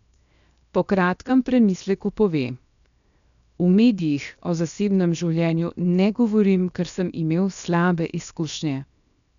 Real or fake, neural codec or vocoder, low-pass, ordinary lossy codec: fake; codec, 16 kHz, 0.7 kbps, FocalCodec; 7.2 kHz; none